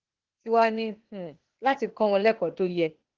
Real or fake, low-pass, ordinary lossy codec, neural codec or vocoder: fake; 7.2 kHz; Opus, 16 kbps; codec, 16 kHz, 0.8 kbps, ZipCodec